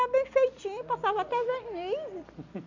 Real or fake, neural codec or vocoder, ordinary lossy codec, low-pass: real; none; none; 7.2 kHz